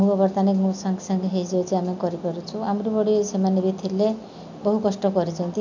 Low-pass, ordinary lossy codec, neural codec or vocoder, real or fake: 7.2 kHz; none; none; real